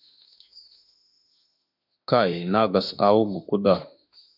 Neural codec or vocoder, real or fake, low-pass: autoencoder, 48 kHz, 32 numbers a frame, DAC-VAE, trained on Japanese speech; fake; 5.4 kHz